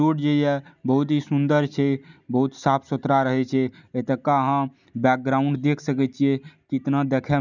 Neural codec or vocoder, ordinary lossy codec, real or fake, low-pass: none; none; real; 7.2 kHz